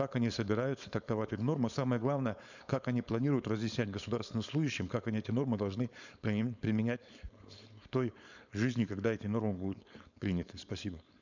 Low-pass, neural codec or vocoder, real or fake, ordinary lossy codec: 7.2 kHz; codec, 16 kHz, 4.8 kbps, FACodec; fake; none